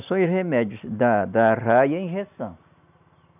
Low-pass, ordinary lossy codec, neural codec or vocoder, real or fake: 3.6 kHz; none; none; real